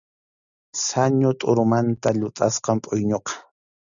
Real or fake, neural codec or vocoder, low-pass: real; none; 7.2 kHz